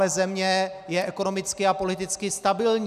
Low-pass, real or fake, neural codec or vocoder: 14.4 kHz; real; none